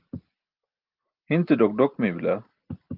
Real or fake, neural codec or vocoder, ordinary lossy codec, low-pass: real; none; Opus, 24 kbps; 5.4 kHz